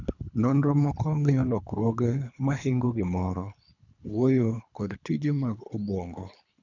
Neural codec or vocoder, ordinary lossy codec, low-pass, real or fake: codec, 24 kHz, 3 kbps, HILCodec; none; 7.2 kHz; fake